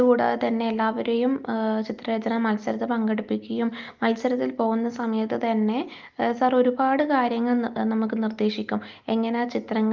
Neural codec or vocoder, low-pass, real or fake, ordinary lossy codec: none; 7.2 kHz; real; Opus, 24 kbps